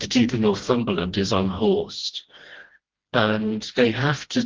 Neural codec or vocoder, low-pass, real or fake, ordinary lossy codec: codec, 16 kHz, 1 kbps, FreqCodec, smaller model; 7.2 kHz; fake; Opus, 16 kbps